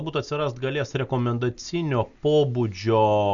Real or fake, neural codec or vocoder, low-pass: real; none; 7.2 kHz